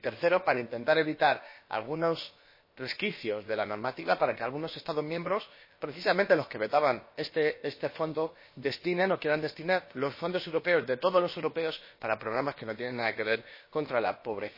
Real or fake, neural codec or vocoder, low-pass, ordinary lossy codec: fake; codec, 16 kHz, about 1 kbps, DyCAST, with the encoder's durations; 5.4 kHz; MP3, 24 kbps